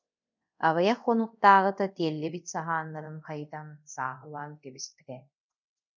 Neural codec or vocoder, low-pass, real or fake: codec, 24 kHz, 0.5 kbps, DualCodec; 7.2 kHz; fake